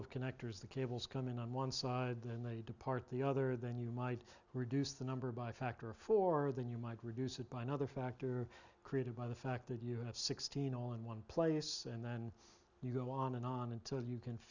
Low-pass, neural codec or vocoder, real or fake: 7.2 kHz; none; real